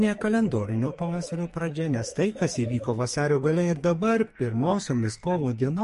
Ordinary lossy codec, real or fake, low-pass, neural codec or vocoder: MP3, 48 kbps; fake; 14.4 kHz; codec, 44.1 kHz, 2.6 kbps, SNAC